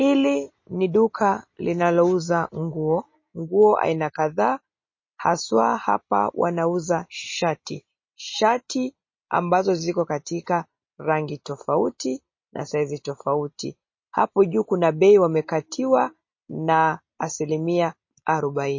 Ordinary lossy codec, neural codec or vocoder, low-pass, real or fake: MP3, 32 kbps; none; 7.2 kHz; real